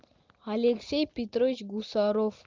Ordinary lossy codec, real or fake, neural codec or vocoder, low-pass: Opus, 24 kbps; real; none; 7.2 kHz